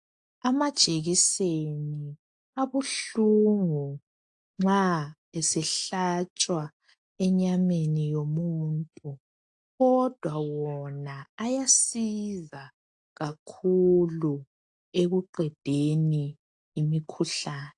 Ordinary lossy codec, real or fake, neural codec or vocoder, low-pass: AAC, 64 kbps; real; none; 10.8 kHz